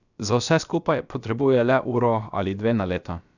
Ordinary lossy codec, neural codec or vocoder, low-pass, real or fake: none; codec, 16 kHz, about 1 kbps, DyCAST, with the encoder's durations; 7.2 kHz; fake